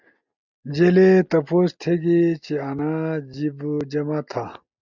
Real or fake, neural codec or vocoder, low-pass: real; none; 7.2 kHz